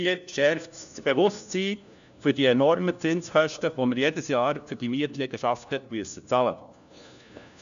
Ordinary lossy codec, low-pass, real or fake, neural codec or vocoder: none; 7.2 kHz; fake; codec, 16 kHz, 1 kbps, FunCodec, trained on LibriTTS, 50 frames a second